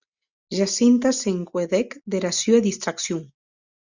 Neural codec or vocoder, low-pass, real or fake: none; 7.2 kHz; real